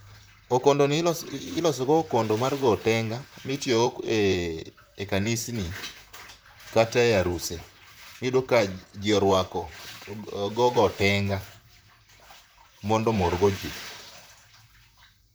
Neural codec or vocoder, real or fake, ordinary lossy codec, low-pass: vocoder, 44.1 kHz, 128 mel bands, Pupu-Vocoder; fake; none; none